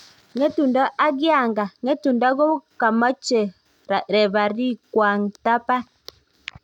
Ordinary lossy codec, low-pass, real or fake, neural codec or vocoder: none; 19.8 kHz; real; none